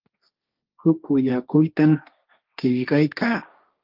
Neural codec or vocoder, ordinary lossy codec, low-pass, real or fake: codec, 16 kHz, 1.1 kbps, Voila-Tokenizer; Opus, 24 kbps; 5.4 kHz; fake